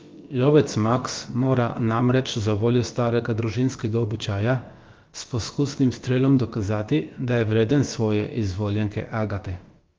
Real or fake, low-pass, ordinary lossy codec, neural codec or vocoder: fake; 7.2 kHz; Opus, 32 kbps; codec, 16 kHz, about 1 kbps, DyCAST, with the encoder's durations